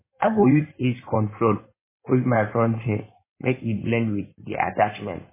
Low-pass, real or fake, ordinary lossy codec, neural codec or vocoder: 3.6 kHz; fake; MP3, 16 kbps; codec, 16 kHz in and 24 kHz out, 2.2 kbps, FireRedTTS-2 codec